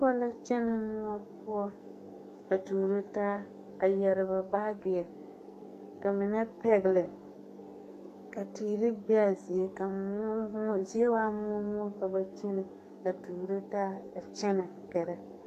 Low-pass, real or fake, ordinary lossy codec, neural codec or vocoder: 14.4 kHz; fake; AAC, 64 kbps; codec, 44.1 kHz, 2.6 kbps, SNAC